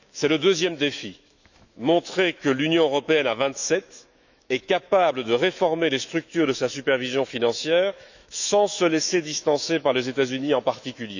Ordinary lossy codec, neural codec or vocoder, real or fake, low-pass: none; autoencoder, 48 kHz, 128 numbers a frame, DAC-VAE, trained on Japanese speech; fake; 7.2 kHz